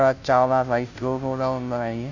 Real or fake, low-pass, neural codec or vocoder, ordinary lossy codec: fake; 7.2 kHz; codec, 16 kHz, 0.5 kbps, FunCodec, trained on Chinese and English, 25 frames a second; none